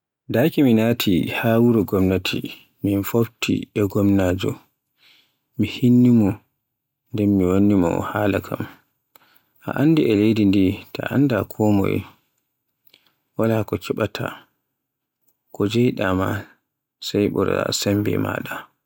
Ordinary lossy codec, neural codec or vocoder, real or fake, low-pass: none; none; real; 19.8 kHz